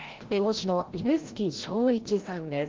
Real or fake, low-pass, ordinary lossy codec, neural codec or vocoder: fake; 7.2 kHz; Opus, 16 kbps; codec, 16 kHz, 0.5 kbps, FreqCodec, larger model